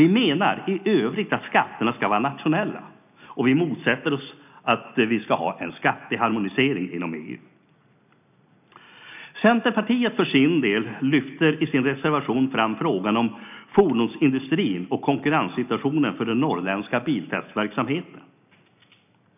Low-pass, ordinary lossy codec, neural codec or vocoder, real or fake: 3.6 kHz; none; none; real